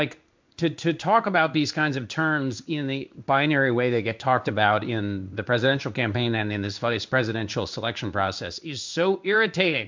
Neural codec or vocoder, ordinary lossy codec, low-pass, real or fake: codec, 16 kHz in and 24 kHz out, 1 kbps, XY-Tokenizer; MP3, 64 kbps; 7.2 kHz; fake